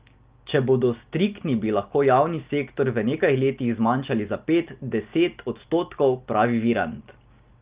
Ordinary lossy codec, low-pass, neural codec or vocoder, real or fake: Opus, 32 kbps; 3.6 kHz; none; real